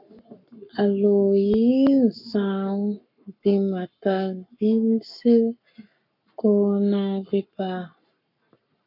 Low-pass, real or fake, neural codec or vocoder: 5.4 kHz; fake; codec, 44.1 kHz, 7.8 kbps, Pupu-Codec